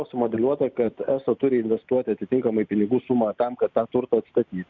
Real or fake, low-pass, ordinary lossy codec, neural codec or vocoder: real; 7.2 kHz; Opus, 64 kbps; none